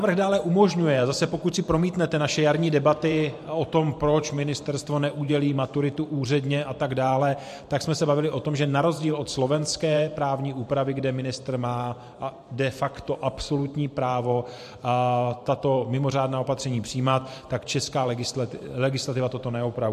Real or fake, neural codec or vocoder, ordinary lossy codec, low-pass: fake; vocoder, 44.1 kHz, 128 mel bands every 512 samples, BigVGAN v2; MP3, 64 kbps; 14.4 kHz